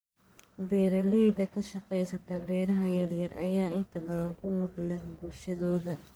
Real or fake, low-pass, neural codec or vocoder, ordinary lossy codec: fake; none; codec, 44.1 kHz, 1.7 kbps, Pupu-Codec; none